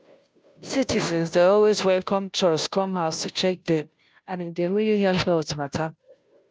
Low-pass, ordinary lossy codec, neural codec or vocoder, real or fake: none; none; codec, 16 kHz, 0.5 kbps, FunCodec, trained on Chinese and English, 25 frames a second; fake